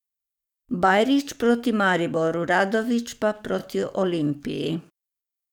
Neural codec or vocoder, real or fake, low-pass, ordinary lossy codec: codec, 44.1 kHz, 7.8 kbps, DAC; fake; 19.8 kHz; none